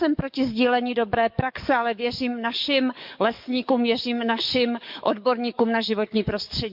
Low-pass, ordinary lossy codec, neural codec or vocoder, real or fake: 5.4 kHz; MP3, 48 kbps; codec, 44.1 kHz, 7.8 kbps, DAC; fake